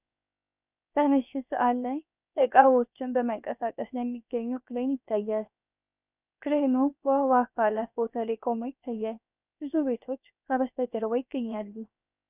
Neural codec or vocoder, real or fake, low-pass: codec, 16 kHz, 0.7 kbps, FocalCodec; fake; 3.6 kHz